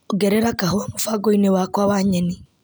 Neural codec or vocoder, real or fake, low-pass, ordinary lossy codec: none; real; none; none